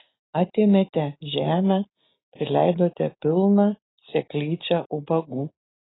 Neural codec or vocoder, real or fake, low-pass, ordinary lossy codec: none; real; 7.2 kHz; AAC, 16 kbps